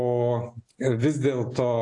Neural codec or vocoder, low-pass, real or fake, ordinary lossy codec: none; 10.8 kHz; real; AAC, 48 kbps